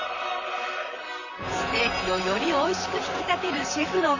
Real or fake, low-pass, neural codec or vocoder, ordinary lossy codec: fake; 7.2 kHz; vocoder, 44.1 kHz, 128 mel bands, Pupu-Vocoder; none